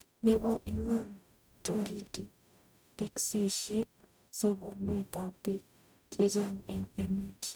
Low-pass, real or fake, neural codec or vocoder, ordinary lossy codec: none; fake; codec, 44.1 kHz, 0.9 kbps, DAC; none